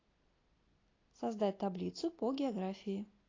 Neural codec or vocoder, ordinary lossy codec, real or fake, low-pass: none; AAC, 32 kbps; real; 7.2 kHz